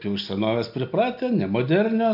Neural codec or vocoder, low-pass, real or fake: none; 5.4 kHz; real